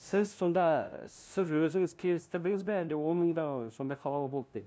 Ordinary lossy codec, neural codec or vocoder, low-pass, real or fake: none; codec, 16 kHz, 0.5 kbps, FunCodec, trained on LibriTTS, 25 frames a second; none; fake